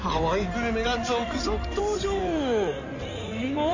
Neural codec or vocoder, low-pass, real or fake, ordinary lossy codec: codec, 16 kHz in and 24 kHz out, 2.2 kbps, FireRedTTS-2 codec; 7.2 kHz; fake; none